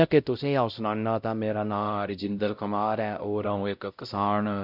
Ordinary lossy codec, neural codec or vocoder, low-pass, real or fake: none; codec, 16 kHz, 0.5 kbps, X-Codec, WavLM features, trained on Multilingual LibriSpeech; 5.4 kHz; fake